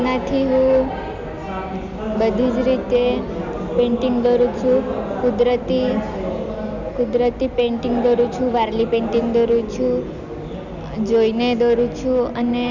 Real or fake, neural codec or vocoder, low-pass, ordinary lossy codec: real; none; 7.2 kHz; none